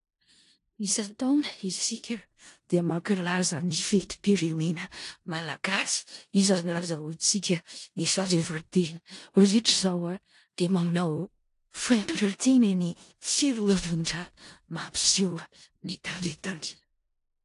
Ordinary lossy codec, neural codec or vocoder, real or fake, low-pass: AAC, 48 kbps; codec, 16 kHz in and 24 kHz out, 0.4 kbps, LongCat-Audio-Codec, four codebook decoder; fake; 10.8 kHz